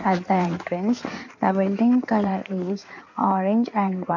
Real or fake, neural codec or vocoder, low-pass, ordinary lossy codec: fake; codec, 16 kHz in and 24 kHz out, 2.2 kbps, FireRedTTS-2 codec; 7.2 kHz; none